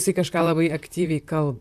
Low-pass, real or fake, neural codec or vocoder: 14.4 kHz; fake; vocoder, 44.1 kHz, 128 mel bands, Pupu-Vocoder